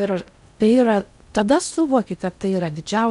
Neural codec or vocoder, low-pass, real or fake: codec, 16 kHz in and 24 kHz out, 0.6 kbps, FocalCodec, streaming, 4096 codes; 10.8 kHz; fake